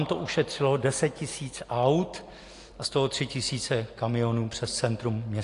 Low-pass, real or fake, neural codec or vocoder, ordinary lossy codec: 10.8 kHz; real; none; AAC, 48 kbps